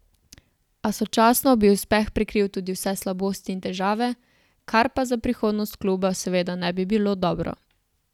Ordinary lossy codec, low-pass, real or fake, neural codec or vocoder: none; 19.8 kHz; real; none